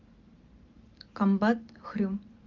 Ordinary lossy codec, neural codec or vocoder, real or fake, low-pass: Opus, 32 kbps; none; real; 7.2 kHz